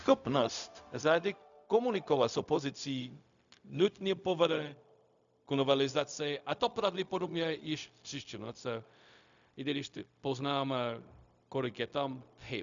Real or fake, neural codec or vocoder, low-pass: fake; codec, 16 kHz, 0.4 kbps, LongCat-Audio-Codec; 7.2 kHz